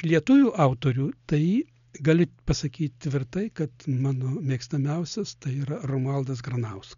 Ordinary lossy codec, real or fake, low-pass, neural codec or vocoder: MP3, 96 kbps; real; 7.2 kHz; none